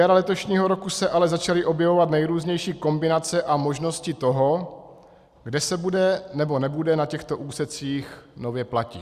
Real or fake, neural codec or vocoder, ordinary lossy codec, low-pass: real; none; Opus, 64 kbps; 14.4 kHz